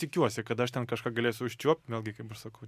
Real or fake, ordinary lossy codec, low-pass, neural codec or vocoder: real; MP3, 96 kbps; 14.4 kHz; none